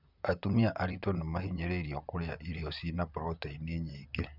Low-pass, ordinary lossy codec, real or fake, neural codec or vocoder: 5.4 kHz; none; fake; vocoder, 44.1 kHz, 128 mel bands, Pupu-Vocoder